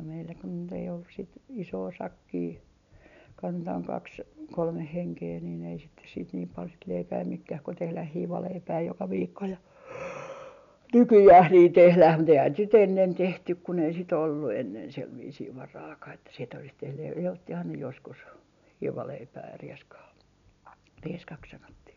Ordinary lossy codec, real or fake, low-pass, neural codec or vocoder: none; real; 7.2 kHz; none